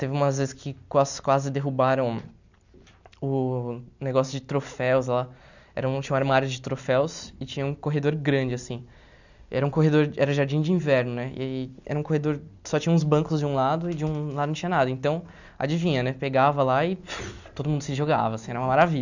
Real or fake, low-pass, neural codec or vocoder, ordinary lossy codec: real; 7.2 kHz; none; none